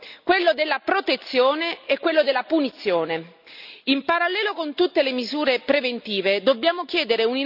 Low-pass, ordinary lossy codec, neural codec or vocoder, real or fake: 5.4 kHz; none; none; real